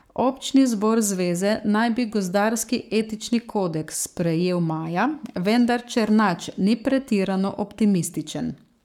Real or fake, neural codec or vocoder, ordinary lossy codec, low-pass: fake; codec, 44.1 kHz, 7.8 kbps, Pupu-Codec; none; 19.8 kHz